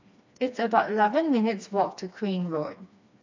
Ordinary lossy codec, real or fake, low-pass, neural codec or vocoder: AAC, 48 kbps; fake; 7.2 kHz; codec, 16 kHz, 2 kbps, FreqCodec, smaller model